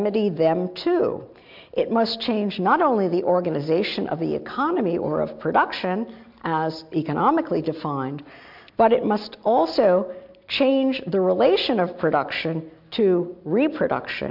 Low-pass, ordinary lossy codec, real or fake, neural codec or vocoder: 5.4 kHz; MP3, 48 kbps; real; none